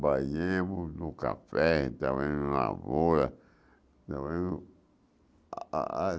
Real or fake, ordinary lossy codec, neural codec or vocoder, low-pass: real; none; none; none